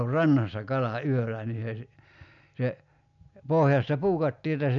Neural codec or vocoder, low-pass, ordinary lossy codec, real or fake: none; 7.2 kHz; none; real